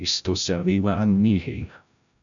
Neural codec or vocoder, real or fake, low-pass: codec, 16 kHz, 0.5 kbps, FreqCodec, larger model; fake; 7.2 kHz